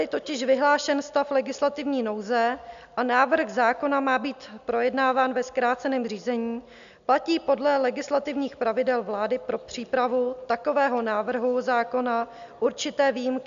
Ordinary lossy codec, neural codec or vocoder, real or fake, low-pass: MP3, 64 kbps; none; real; 7.2 kHz